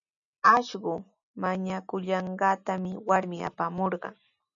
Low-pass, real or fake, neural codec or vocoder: 7.2 kHz; real; none